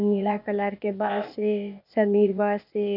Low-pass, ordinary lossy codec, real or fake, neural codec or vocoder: 5.4 kHz; none; fake; codec, 16 kHz, 0.8 kbps, ZipCodec